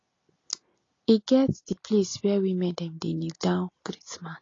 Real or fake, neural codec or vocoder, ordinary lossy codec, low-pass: real; none; AAC, 32 kbps; 7.2 kHz